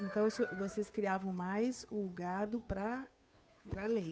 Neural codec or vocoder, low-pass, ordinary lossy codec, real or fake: codec, 16 kHz, 2 kbps, FunCodec, trained on Chinese and English, 25 frames a second; none; none; fake